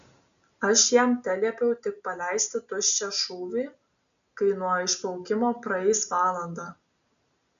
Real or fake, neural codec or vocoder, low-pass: real; none; 7.2 kHz